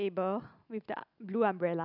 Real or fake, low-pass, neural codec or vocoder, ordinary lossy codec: real; 5.4 kHz; none; none